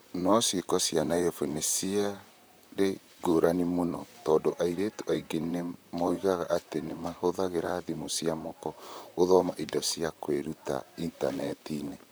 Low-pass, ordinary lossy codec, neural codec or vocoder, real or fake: none; none; vocoder, 44.1 kHz, 128 mel bands, Pupu-Vocoder; fake